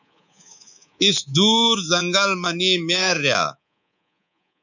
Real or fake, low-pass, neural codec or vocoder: fake; 7.2 kHz; codec, 24 kHz, 3.1 kbps, DualCodec